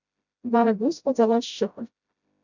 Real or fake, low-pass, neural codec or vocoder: fake; 7.2 kHz; codec, 16 kHz, 0.5 kbps, FreqCodec, smaller model